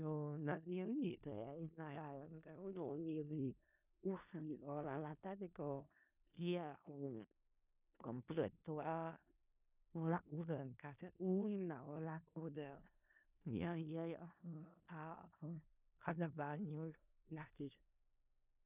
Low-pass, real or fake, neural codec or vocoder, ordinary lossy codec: 3.6 kHz; fake; codec, 16 kHz in and 24 kHz out, 0.4 kbps, LongCat-Audio-Codec, four codebook decoder; none